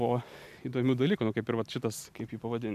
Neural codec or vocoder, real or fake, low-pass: none; real; 14.4 kHz